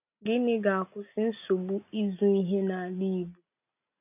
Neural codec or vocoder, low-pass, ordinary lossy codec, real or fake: none; 3.6 kHz; none; real